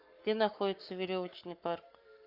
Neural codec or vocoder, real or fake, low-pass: codec, 44.1 kHz, 7.8 kbps, Pupu-Codec; fake; 5.4 kHz